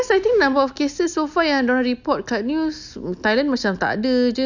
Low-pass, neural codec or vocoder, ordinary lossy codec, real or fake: 7.2 kHz; none; none; real